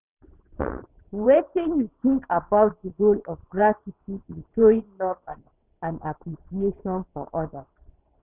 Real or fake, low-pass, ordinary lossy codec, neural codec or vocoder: fake; 3.6 kHz; none; vocoder, 22.05 kHz, 80 mel bands, WaveNeXt